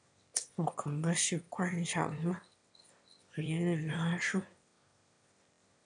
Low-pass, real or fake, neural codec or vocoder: 9.9 kHz; fake; autoencoder, 22.05 kHz, a latent of 192 numbers a frame, VITS, trained on one speaker